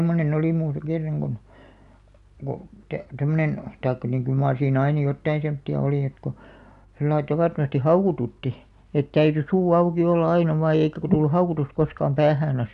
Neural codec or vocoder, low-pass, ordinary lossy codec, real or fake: none; 10.8 kHz; none; real